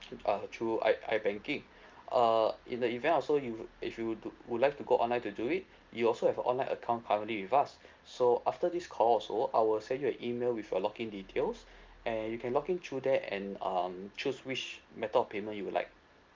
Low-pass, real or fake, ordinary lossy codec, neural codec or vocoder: 7.2 kHz; real; Opus, 24 kbps; none